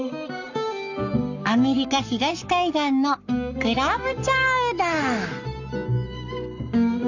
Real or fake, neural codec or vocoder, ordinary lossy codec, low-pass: fake; codec, 44.1 kHz, 7.8 kbps, Pupu-Codec; none; 7.2 kHz